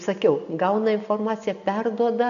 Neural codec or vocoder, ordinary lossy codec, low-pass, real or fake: none; MP3, 96 kbps; 7.2 kHz; real